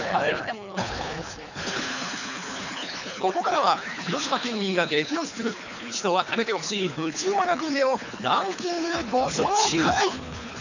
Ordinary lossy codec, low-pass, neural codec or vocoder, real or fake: none; 7.2 kHz; codec, 24 kHz, 3 kbps, HILCodec; fake